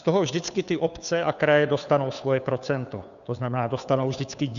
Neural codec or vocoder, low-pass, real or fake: codec, 16 kHz, 2 kbps, FunCodec, trained on Chinese and English, 25 frames a second; 7.2 kHz; fake